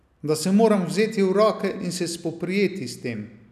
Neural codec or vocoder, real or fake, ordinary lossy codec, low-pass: none; real; none; 14.4 kHz